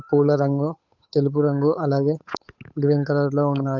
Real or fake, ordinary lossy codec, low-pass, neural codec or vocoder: fake; none; 7.2 kHz; codec, 16 kHz, 8 kbps, FunCodec, trained on Chinese and English, 25 frames a second